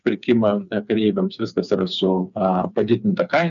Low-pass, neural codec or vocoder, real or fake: 7.2 kHz; codec, 16 kHz, 4 kbps, FreqCodec, smaller model; fake